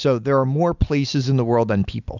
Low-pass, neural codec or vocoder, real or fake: 7.2 kHz; none; real